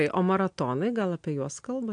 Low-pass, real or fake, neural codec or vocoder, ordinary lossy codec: 9.9 kHz; real; none; AAC, 64 kbps